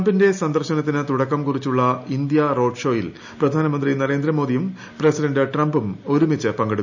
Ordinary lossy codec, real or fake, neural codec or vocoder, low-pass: none; real; none; 7.2 kHz